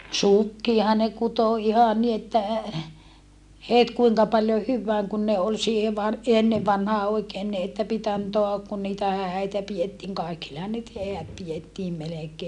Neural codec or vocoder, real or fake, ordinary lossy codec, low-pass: none; real; none; 10.8 kHz